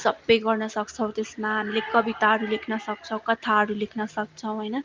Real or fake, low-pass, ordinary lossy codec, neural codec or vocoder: real; 7.2 kHz; Opus, 32 kbps; none